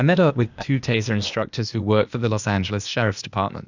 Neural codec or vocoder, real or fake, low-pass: codec, 16 kHz, 0.8 kbps, ZipCodec; fake; 7.2 kHz